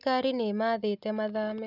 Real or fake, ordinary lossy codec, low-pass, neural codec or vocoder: real; none; 5.4 kHz; none